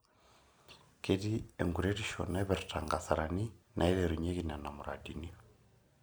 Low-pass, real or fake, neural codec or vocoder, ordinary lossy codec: none; real; none; none